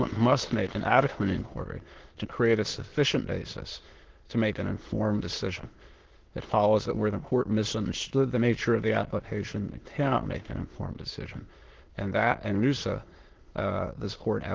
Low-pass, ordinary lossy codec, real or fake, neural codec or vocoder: 7.2 kHz; Opus, 16 kbps; fake; autoencoder, 22.05 kHz, a latent of 192 numbers a frame, VITS, trained on many speakers